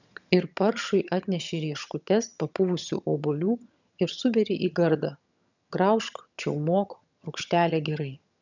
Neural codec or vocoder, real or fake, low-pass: vocoder, 22.05 kHz, 80 mel bands, HiFi-GAN; fake; 7.2 kHz